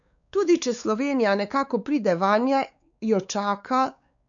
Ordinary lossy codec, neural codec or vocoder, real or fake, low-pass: none; codec, 16 kHz, 4 kbps, X-Codec, WavLM features, trained on Multilingual LibriSpeech; fake; 7.2 kHz